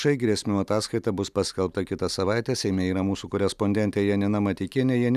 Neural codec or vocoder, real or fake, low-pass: none; real; 14.4 kHz